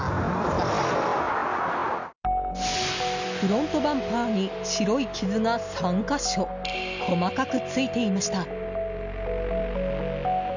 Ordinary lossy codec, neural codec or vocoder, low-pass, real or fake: none; none; 7.2 kHz; real